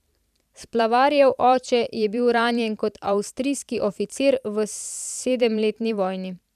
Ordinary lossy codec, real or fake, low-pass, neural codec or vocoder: none; fake; 14.4 kHz; vocoder, 44.1 kHz, 128 mel bands every 512 samples, BigVGAN v2